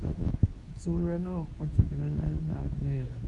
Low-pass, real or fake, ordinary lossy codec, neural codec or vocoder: 10.8 kHz; fake; none; codec, 24 kHz, 0.9 kbps, WavTokenizer, medium speech release version 2